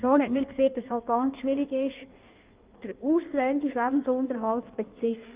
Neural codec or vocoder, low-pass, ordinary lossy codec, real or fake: codec, 16 kHz in and 24 kHz out, 1.1 kbps, FireRedTTS-2 codec; 3.6 kHz; Opus, 32 kbps; fake